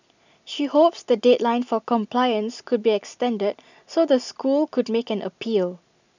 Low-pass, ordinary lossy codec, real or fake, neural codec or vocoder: 7.2 kHz; none; real; none